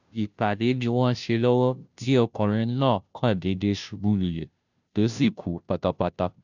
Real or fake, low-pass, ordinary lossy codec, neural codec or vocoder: fake; 7.2 kHz; none; codec, 16 kHz, 0.5 kbps, FunCodec, trained on Chinese and English, 25 frames a second